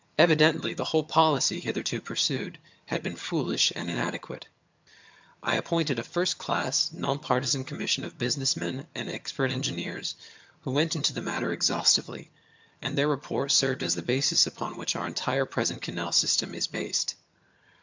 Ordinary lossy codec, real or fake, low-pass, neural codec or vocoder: MP3, 64 kbps; fake; 7.2 kHz; vocoder, 22.05 kHz, 80 mel bands, HiFi-GAN